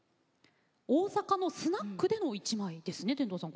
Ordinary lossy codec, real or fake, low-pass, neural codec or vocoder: none; real; none; none